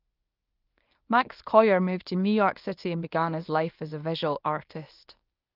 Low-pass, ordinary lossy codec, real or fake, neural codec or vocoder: 5.4 kHz; Opus, 32 kbps; fake; codec, 24 kHz, 0.9 kbps, WavTokenizer, medium speech release version 1